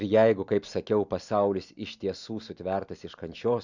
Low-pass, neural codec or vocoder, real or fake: 7.2 kHz; none; real